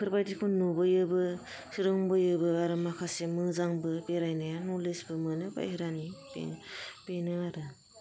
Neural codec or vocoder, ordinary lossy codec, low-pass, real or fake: none; none; none; real